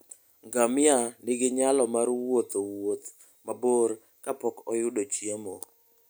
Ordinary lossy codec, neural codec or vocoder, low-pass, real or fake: none; none; none; real